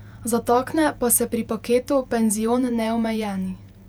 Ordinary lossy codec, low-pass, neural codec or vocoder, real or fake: none; 19.8 kHz; vocoder, 48 kHz, 128 mel bands, Vocos; fake